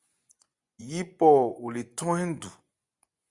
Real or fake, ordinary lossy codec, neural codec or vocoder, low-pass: fake; Opus, 64 kbps; vocoder, 24 kHz, 100 mel bands, Vocos; 10.8 kHz